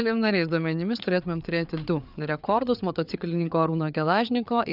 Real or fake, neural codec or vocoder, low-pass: fake; codec, 16 kHz, 4 kbps, FreqCodec, larger model; 5.4 kHz